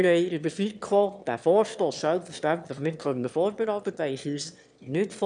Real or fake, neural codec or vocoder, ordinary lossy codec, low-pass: fake; autoencoder, 22.05 kHz, a latent of 192 numbers a frame, VITS, trained on one speaker; none; 9.9 kHz